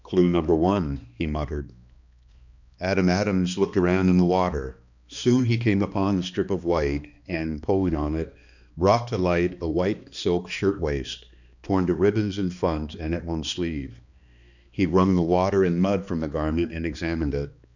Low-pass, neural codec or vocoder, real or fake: 7.2 kHz; codec, 16 kHz, 2 kbps, X-Codec, HuBERT features, trained on balanced general audio; fake